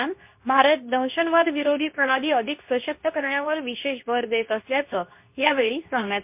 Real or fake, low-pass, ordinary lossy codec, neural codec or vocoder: fake; 3.6 kHz; MP3, 32 kbps; codec, 24 kHz, 0.9 kbps, WavTokenizer, medium speech release version 2